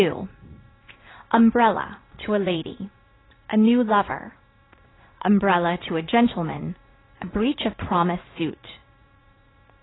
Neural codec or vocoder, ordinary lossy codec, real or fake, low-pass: vocoder, 44.1 kHz, 80 mel bands, Vocos; AAC, 16 kbps; fake; 7.2 kHz